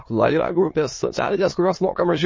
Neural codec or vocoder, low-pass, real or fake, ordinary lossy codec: autoencoder, 22.05 kHz, a latent of 192 numbers a frame, VITS, trained on many speakers; 7.2 kHz; fake; MP3, 32 kbps